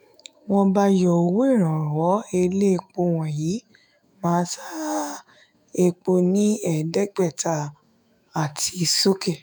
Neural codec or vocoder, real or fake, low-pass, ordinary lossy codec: autoencoder, 48 kHz, 128 numbers a frame, DAC-VAE, trained on Japanese speech; fake; none; none